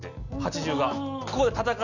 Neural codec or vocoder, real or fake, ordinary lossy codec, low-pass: none; real; none; 7.2 kHz